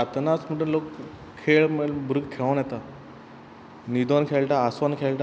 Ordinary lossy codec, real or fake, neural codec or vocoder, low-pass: none; real; none; none